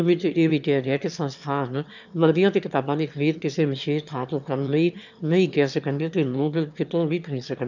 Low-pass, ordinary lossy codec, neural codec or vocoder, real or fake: 7.2 kHz; none; autoencoder, 22.05 kHz, a latent of 192 numbers a frame, VITS, trained on one speaker; fake